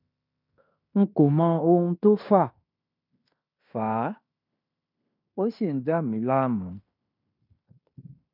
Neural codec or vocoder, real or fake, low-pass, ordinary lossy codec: codec, 16 kHz in and 24 kHz out, 0.9 kbps, LongCat-Audio-Codec, fine tuned four codebook decoder; fake; 5.4 kHz; none